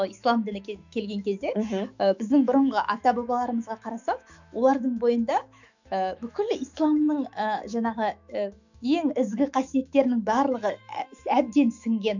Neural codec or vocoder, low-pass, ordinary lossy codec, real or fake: autoencoder, 48 kHz, 128 numbers a frame, DAC-VAE, trained on Japanese speech; 7.2 kHz; none; fake